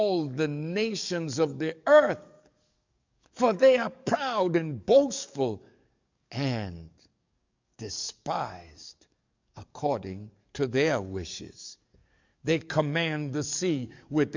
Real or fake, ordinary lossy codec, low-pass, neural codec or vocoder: fake; MP3, 64 kbps; 7.2 kHz; codec, 44.1 kHz, 7.8 kbps, DAC